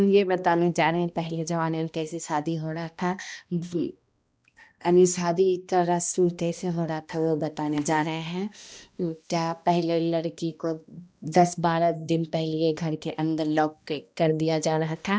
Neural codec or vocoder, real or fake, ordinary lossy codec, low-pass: codec, 16 kHz, 1 kbps, X-Codec, HuBERT features, trained on balanced general audio; fake; none; none